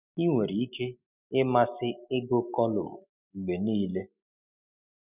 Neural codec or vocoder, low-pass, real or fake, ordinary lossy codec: none; 3.6 kHz; real; none